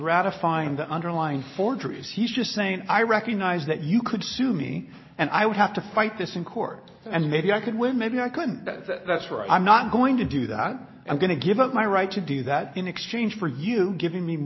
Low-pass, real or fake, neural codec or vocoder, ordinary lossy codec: 7.2 kHz; real; none; MP3, 24 kbps